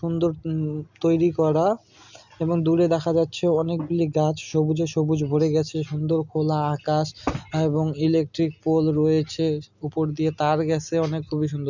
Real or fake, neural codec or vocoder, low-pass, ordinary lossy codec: real; none; 7.2 kHz; none